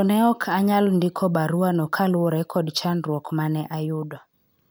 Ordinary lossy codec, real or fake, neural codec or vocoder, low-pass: none; real; none; none